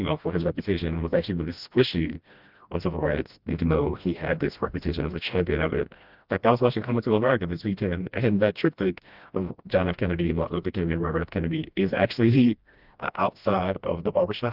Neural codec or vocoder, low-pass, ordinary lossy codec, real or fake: codec, 16 kHz, 1 kbps, FreqCodec, smaller model; 5.4 kHz; Opus, 24 kbps; fake